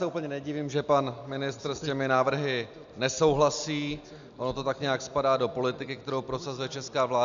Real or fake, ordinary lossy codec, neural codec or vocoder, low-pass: real; MP3, 96 kbps; none; 7.2 kHz